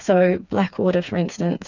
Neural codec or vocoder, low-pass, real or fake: codec, 16 kHz, 4 kbps, FreqCodec, smaller model; 7.2 kHz; fake